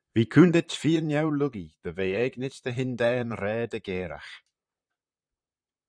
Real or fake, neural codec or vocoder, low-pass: fake; vocoder, 44.1 kHz, 128 mel bands, Pupu-Vocoder; 9.9 kHz